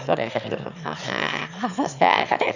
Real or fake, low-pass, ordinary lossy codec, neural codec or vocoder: fake; 7.2 kHz; none; autoencoder, 22.05 kHz, a latent of 192 numbers a frame, VITS, trained on one speaker